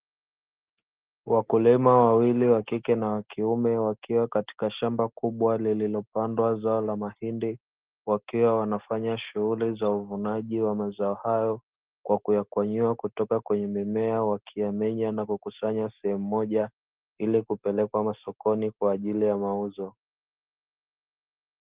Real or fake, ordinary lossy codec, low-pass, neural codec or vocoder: real; Opus, 16 kbps; 3.6 kHz; none